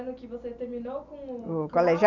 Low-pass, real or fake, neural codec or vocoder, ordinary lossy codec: 7.2 kHz; real; none; none